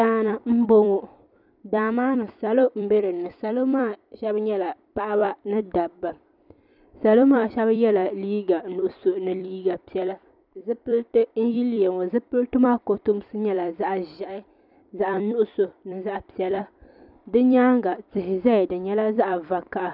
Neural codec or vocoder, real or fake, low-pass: vocoder, 44.1 kHz, 128 mel bands every 256 samples, BigVGAN v2; fake; 5.4 kHz